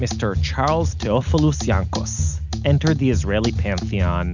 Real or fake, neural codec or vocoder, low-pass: real; none; 7.2 kHz